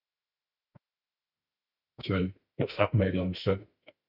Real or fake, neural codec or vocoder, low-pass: fake; autoencoder, 48 kHz, 32 numbers a frame, DAC-VAE, trained on Japanese speech; 5.4 kHz